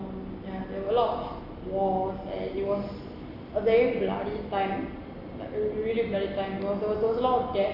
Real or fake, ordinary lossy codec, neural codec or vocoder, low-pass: real; none; none; 5.4 kHz